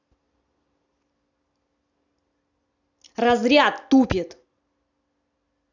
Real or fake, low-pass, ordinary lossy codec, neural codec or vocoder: real; 7.2 kHz; none; none